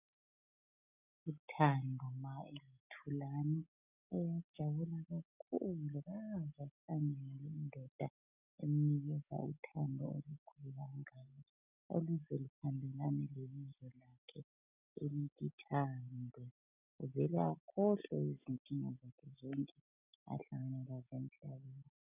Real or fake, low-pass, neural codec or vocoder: real; 3.6 kHz; none